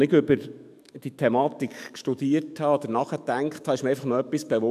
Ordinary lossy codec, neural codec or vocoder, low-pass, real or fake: AAC, 96 kbps; autoencoder, 48 kHz, 128 numbers a frame, DAC-VAE, trained on Japanese speech; 14.4 kHz; fake